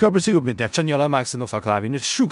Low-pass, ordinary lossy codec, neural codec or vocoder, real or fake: 10.8 kHz; MP3, 96 kbps; codec, 16 kHz in and 24 kHz out, 0.4 kbps, LongCat-Audio-Codec, four codebook decoder; fake